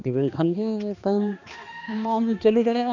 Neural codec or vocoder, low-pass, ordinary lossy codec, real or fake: codec, 16 kHz, 2 kbps, X-Codec, HuBERT features, trained on balanced general audio; 7.2 kHz; none; fake